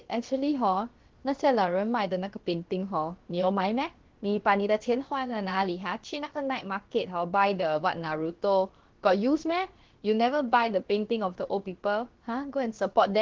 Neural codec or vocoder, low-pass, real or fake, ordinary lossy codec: codec, 16 kHz, about 1 kbps, DyCAST, with the encoder's durations; 7.2 kHz; fake; Opus, 16 kbps